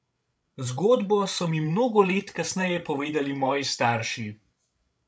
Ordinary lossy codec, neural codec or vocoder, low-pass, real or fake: none; codec, 16 kHz, 16 kbps, FreqCodec, larger model; none; fake